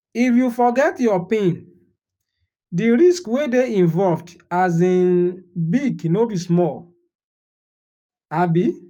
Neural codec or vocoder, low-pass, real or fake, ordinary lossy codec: codec, 44.1 kHz, 7.8 kbps, DAC; 19.8 kHz; fake; none